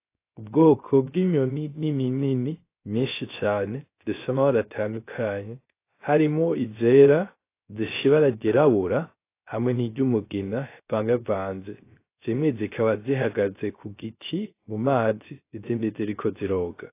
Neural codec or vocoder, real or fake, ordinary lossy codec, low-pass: codec, 16 kHz, 0.3 kbps, FocalCodec; fake; AAC, 24 kbps; 3.6 kHz